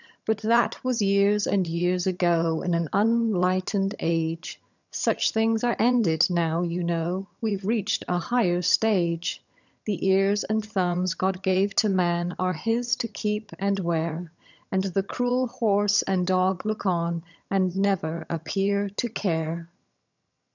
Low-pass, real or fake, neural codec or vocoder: 7.2 kHz; fake; vocoder, 22.05 kHz, 80 mel bands, HiFi-GAN